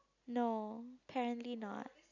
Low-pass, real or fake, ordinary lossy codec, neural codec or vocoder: 7.2 kHz; real; none; none